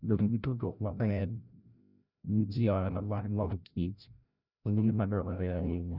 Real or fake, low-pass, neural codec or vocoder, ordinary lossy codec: fake; 5.4 kHz; codec, 16 kHz, 0.5 kbps, FreqCodec, larger model; none